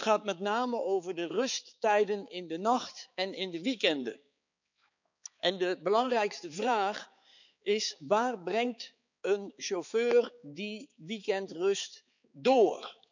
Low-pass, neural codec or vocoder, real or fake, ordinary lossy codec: 7.2 kHz; codec, 16 kHz, 4 kbps, X-Codec, HuBERT features, trained on balanced general audio; fake; none